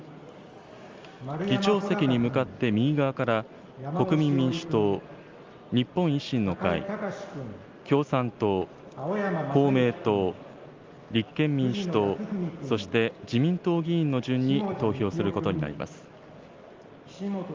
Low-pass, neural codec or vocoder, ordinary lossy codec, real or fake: 7.2 kHz; none; Opus, 32 kbps; real